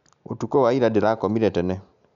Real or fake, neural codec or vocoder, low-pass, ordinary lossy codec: real; none; 7.2 kHz; none